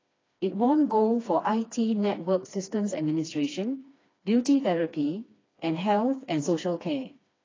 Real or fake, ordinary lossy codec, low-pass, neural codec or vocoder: fake; AAC, 32 kbps; 7.2 kHz; codec, 16 kHz, 2 kbps, FreqCodec, smaller model